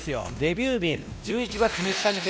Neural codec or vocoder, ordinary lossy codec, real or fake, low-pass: codec, 16 kHz, 1 kbps, X-Codec, WavLM features, trained on Multilingual LibriSpeech; none; fake; none